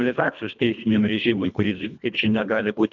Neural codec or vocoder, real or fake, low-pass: codec, 24 kHz, 1.5 kbps, HILCodec; fake; 7.2 kHz